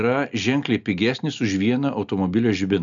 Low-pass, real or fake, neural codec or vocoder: 7.2 kHz; real; none